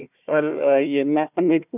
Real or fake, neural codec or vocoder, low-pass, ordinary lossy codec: fake; codec, 16 kHz, 1 kbps, FunCodec, trained on Chinese and English, 50 frames a second; 3.6 kHz; none